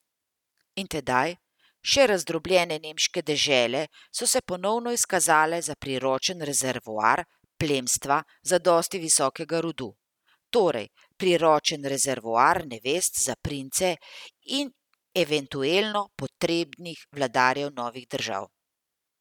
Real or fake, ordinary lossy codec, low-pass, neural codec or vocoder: real; none; 19.8 kHz; none